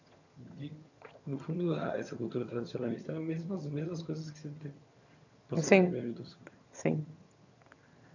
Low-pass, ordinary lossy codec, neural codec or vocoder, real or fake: 7.2 kHz; none; vocoder, 22.05 kHz, 80 mel bands, HiFi-GAN; fake